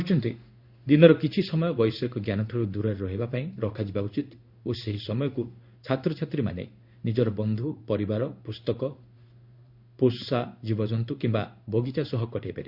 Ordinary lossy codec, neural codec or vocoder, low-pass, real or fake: Opus, 64 kbps; codec, 16 kHz in and 24 kHz out, 1 kbps, XY-Tokenizer; 5.4 kHz; fake